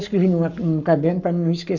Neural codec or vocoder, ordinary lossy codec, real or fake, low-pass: codec, 44.1 kHz, 7.8 kbps, Pupu-Codec; none; fake; 7.2 kHz